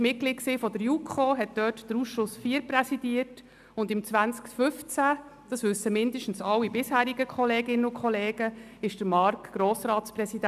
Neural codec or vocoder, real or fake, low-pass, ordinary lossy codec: none; real; 14.4 kHz; none